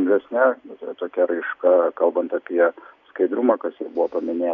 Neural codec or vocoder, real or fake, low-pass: none; real; 7.2 kHz